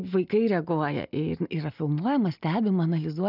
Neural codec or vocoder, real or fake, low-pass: none; real; 5.4 kHz